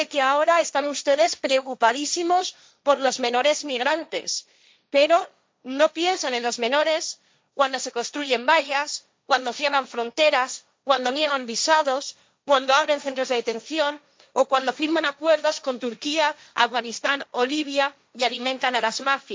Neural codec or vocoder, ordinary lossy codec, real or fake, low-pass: codec, 16 kHz, 1.1 kbps, Voila-Tokenizer; none; fake; none